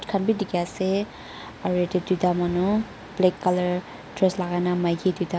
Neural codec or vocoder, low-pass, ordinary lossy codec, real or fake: none; none; none; real